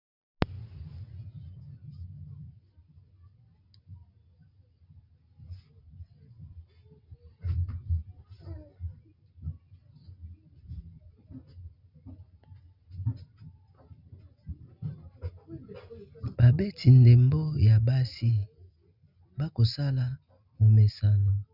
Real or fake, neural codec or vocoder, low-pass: real; none; 5.4 kHz